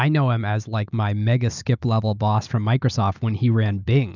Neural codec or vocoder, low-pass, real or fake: none; 7.2 kHz; real